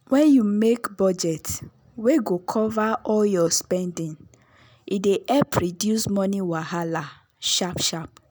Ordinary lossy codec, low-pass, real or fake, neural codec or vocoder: none; none; real; none